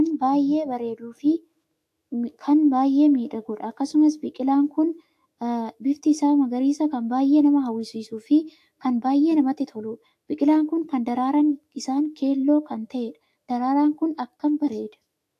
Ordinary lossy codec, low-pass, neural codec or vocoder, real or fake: AAC, 64 kbps; 14.4 kHz; autoencoder, 48 kHz, 128 numbers a frame, DAC-VAE, trained on Japanese speech; fake